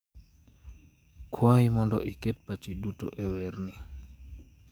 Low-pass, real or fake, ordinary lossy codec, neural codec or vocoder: none; fake; none; codec, 44.1 kHz, 7.8 kbps, DAC